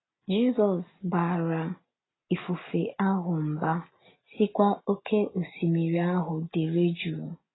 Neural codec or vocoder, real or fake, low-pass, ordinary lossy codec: none; real; 7.2 kHz; AAC, 16 kbps